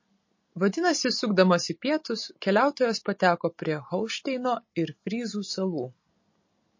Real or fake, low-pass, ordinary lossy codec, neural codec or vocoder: real; 7.2 kHz; MP3, 32 kbps; none